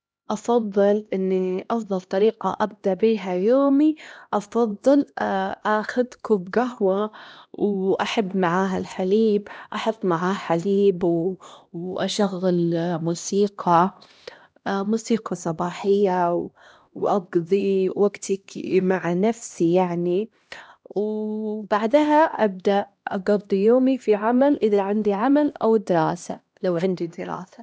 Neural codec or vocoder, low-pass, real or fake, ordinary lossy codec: codec, 16 kHz, 1 kbps, X-Codec, HuBERT features, trained on LibriSpeech; none; fake; none